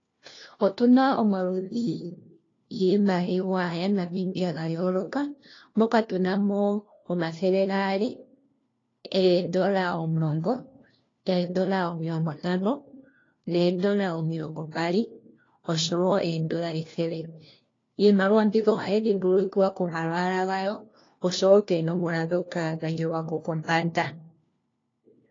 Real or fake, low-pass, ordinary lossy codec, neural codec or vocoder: fake; 7.2 kHz; AAC, 32 kbps; codec, 16 kHz, 1 kbps, FunCodec, trained on LibriTTS, 50 frames a second